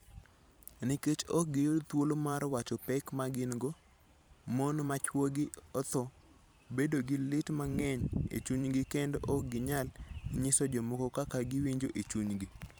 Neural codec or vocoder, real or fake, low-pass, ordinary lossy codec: vocoder, 44.1 kHz, 128 mel bands every 512 samples, BigVGAN v2; fake; none; none